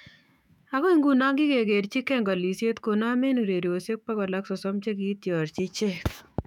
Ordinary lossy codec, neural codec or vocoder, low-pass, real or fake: none; autoencoder, 48 kHz, 128 numbers a frame, DAC-VAE, trained on Japanese speech; 19.8 kHz; fake